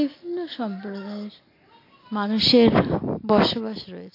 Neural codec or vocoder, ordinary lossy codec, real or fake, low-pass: none; AAC, 32 kbps; real; 5.4 kHz